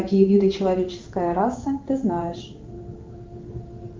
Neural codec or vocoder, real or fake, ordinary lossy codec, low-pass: codec, 16 kHz in and 24 kHz out, 1 kbps, XY-Tokenizer; fake; Opus, 24 kbps; 7.2 kHz